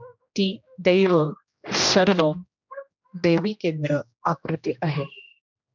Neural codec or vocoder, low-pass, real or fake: codec, 16 kHz, 1 kbps, X-Codec, HuBERT features, trained on general audio; 7.2 kHz; fake